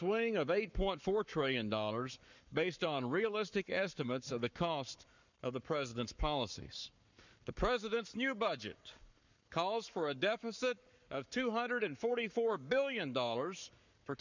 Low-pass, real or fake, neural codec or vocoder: 7.2 kHz; fake; codec, 44.1 kHz, 7.8 kbps, Pupu-Codec